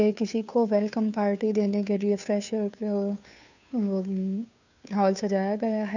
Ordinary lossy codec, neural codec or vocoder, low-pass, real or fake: none; codec, 16 kHz, 2 kbps, FunCodec, trained on Chinese and English, 25 frames a second; 7.2 kHz; fake